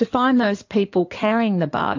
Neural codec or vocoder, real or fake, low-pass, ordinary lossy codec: codec, 16 kHz in and 24 kHz out, 2.2 kbps, FireRedTTS-2 codec; fake; 7.2 kHz; AAC, 48 kbps